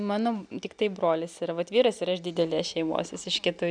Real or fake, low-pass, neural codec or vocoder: real; 9.9 kHz; none